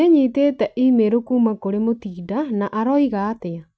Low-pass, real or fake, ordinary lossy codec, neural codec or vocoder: none; real; none; none